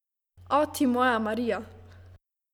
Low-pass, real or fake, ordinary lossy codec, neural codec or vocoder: 19.8 kHz; real; none; none